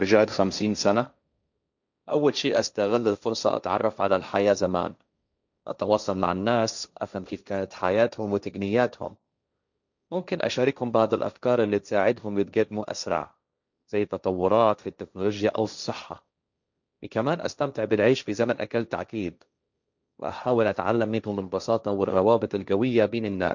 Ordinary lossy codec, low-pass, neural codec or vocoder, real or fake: none; 7.2 kHz; codec, 16 kHz, 1.1 kbps, Voila-Tokenizer; fake